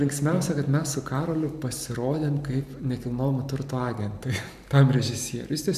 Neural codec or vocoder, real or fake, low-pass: vocoder, 44.1 kHz, 128 mel bands every 512 samples, BigVGAN v2; fake; 14.4 kHz